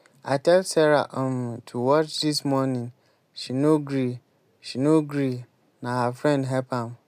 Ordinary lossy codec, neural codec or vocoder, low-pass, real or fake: MP3, 96 kbps; none; 14.4 kHz; real